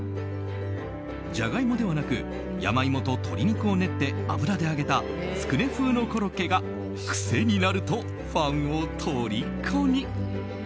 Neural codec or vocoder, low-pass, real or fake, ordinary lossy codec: none; none; real; none